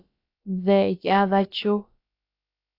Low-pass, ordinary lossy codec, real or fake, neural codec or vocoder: 5.4 kHz; AAC, 32 kbps; fake; codec, 16 kHz, about 1 kbps, DyCAST, with the encoder's durations